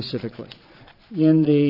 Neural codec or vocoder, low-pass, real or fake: vocoder, 22.05 kHz, 80 mel bands, Vocos; 5.4 kHz; fake